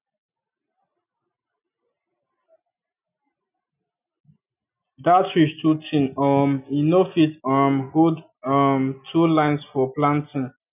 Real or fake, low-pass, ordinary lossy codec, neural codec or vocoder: real; 3.6 kHz; none; none